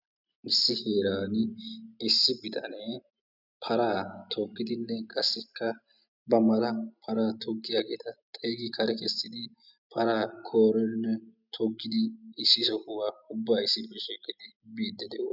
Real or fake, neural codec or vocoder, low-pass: real; none; 5.4 kHz